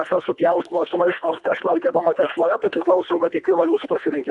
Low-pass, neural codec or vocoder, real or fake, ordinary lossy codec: 10.8 kHz; codec, 24 kHz, 1.5 kbps, HILCodec; fake; MP3, 96 kbps